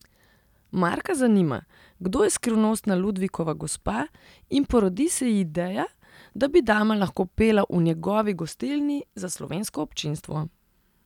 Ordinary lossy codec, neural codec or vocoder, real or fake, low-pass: none; none; real; 19.8 kHz